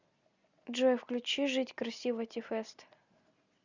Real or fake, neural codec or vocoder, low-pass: real; none; 7.2 kHz